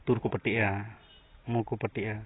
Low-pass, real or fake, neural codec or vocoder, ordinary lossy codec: 7.2 kHz; real; none; AAC, 16 kbps